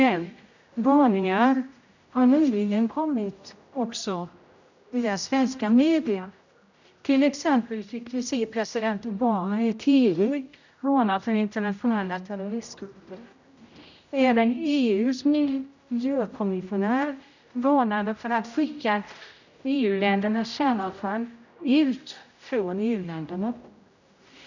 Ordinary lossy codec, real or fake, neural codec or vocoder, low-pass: none; fake; codec, 16 kHz, 0.5 kbps, X-Codec, HuBERT features, trained on general audio; 7.2 kHz